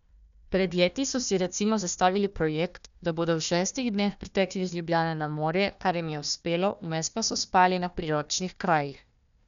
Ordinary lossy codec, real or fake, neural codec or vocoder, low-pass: none; fake; codec, 16 kHz, 1 kbps, FunCodec, trained on Chinese and English, 50 frames a second; 7.2 kHz